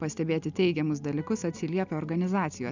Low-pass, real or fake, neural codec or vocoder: 7.2 kHz; real; none